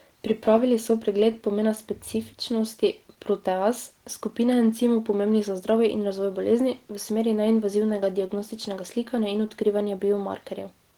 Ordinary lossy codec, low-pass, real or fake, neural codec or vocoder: Opus, 16 kbps; 19.8 kHz; real; none